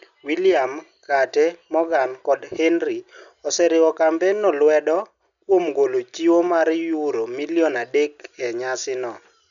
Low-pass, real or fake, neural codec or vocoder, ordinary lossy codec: 7.2 kHz; real; none; none